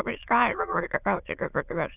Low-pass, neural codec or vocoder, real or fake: 3.6 kHz; autoencoder, 22.05 kHz, a latent of 192 numbers a frame, VITS, trained on many speakers; fake